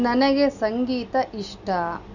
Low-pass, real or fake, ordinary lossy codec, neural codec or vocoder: 7.2 kHz; real; none; none